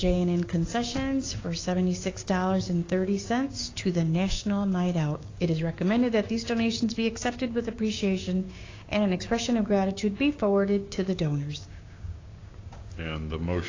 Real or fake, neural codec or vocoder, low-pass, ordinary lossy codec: fake; codec, 16 kHz, 6 kbps, DAC; 7.2 kHz; AAC, 32 kbps